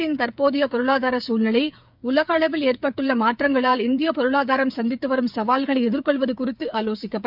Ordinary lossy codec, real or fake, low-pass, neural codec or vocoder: none; fake; 5.4 kHz; codec, 16 kHz, 8 kbps, FreqCodec, smaller model